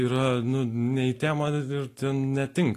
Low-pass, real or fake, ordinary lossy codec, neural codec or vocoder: 14.4 kHz; real; AAC, 48 kbps; none